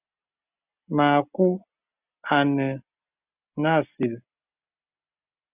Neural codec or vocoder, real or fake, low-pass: none; real; 3.6 kHz